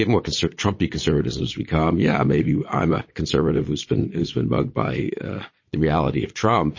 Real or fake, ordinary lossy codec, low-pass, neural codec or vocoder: fake; MP3, 32 kbps; 7.2 kHz; vocoder, 22.05 kHz, 80 mel bands, Vocos